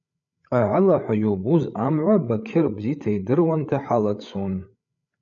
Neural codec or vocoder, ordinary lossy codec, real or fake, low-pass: codec, 16 kHz, 8 kbps, FreqCodec, larger model; MP3, 96 kbps; fake; 7.2 kHz